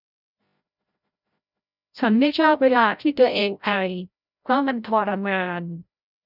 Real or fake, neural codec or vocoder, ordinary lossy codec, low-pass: fake; codec, 16 kHz, 0.5 kbps, FreqCodec, larger model; none; 5.4 kHz